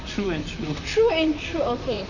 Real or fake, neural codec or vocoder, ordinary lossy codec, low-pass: fake; vocoder, 44.1 kHz, 128 mel bands, Pupu-Vocoder; none; 7.2 kHz